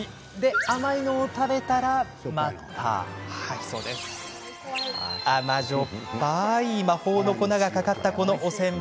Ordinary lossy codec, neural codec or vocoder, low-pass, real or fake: none; none; none; real